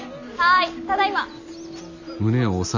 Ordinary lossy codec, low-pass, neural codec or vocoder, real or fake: none; 7.2 kHz; none; real